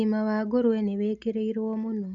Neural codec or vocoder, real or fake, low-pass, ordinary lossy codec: none; real; 7.2 kHz; Opus, 64 kbps